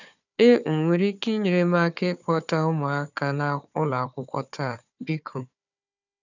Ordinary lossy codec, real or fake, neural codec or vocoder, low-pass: none; fake; codec, 16 kHz, 4 kbps, FunCodec, trained on Chinese and English, 50 frames a second; 7.2 kHz